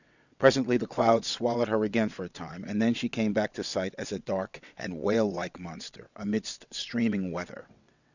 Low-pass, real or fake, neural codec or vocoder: 7.2 kHz; fake; vocoder, 22.05 kHz, 80 mel bands, WaveNeXt